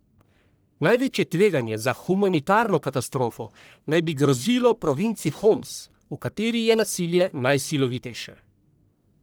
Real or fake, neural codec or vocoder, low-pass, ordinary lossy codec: fake; codec, 44.1 kHz, 1.7 kbps, Pupu-Codec; none; none